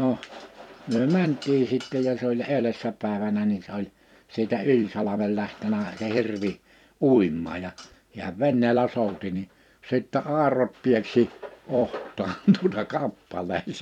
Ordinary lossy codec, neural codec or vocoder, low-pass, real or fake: none; vocoder, 44.1 kHz, 128 mel bands every 256 samples, BigVGAN v2; 19.8 kHz; fake